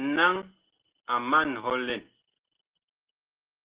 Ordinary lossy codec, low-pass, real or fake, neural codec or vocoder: Opus, 16 kbps; 3.6 kHz; real; none